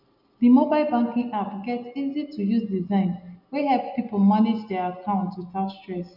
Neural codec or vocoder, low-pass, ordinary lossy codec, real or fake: none; 5.4 kHz; none; real